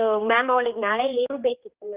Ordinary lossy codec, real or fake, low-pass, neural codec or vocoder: Opus, 32 kbps; fake; 3.6 kHz; codec, 16 kHz, 2 kbps, X-Codec, HuBERT features, trained on general audio